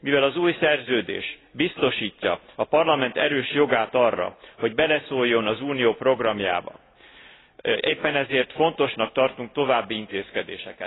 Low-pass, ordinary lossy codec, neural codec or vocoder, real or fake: 7.2 kHz; AAC, 16 kbps; none; real